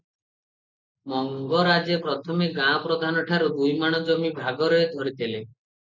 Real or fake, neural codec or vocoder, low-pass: real; none; 7.2 kHz